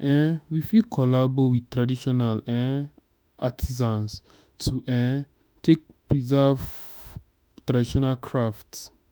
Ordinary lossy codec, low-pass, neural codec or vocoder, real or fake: none; none; autoencoder, 48 kHz, 32 numbers a frame, DAC-VAE, trained on Japanese speech; fake